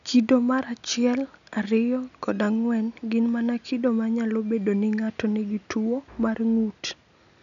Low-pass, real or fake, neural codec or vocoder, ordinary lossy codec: 7.2 kHz; real; none; none